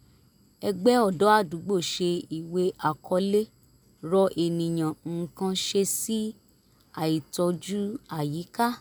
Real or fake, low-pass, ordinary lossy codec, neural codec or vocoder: real; none; none; none